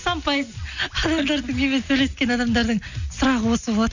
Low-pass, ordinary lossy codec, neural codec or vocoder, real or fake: 7.2 kHz; none; none; real